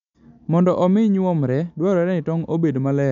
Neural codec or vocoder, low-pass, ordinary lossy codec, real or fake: none; 7.2 kHz; none; real